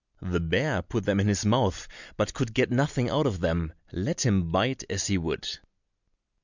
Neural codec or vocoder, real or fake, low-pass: none; real; 7.2 kHz